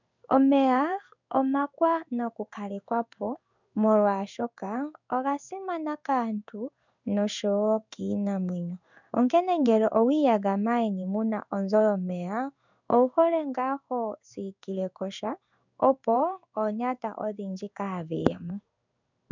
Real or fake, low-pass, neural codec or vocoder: fake; 7.2 kHz; codec, 16 kHz in and 24 kHz out, 1 kbps, XY-Tokenizer